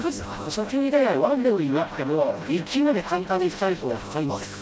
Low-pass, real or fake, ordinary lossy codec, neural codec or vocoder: none; fake; none; codec, 16 kHz, 0.5 kbps, FreqCodec, smaller model